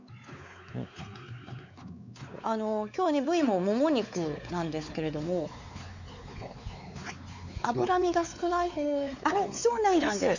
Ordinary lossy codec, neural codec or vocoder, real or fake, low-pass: none; codec, 16 kHz, 4 kbps, X-Codec, WavLM features, trained on Multilingual LibriSpeech; fake; 7.2 kHz